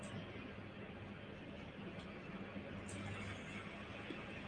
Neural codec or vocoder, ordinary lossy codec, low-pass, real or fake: none; Opus, 32 kbps; 9.9 kHz; real